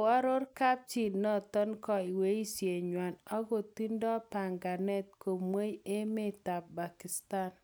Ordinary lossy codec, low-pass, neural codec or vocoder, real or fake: none; none; none; real